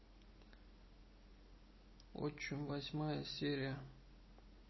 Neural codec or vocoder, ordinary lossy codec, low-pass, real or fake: none; MP3, 24 kbps; 7.2 kHz; real